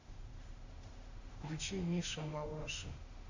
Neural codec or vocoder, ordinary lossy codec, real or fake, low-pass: autoencoder, 48 kHz, 32 numbers a frame, DAC-VAE, trained on Japanese speech; Opus, 64 kbps; fake; 7.2 kHz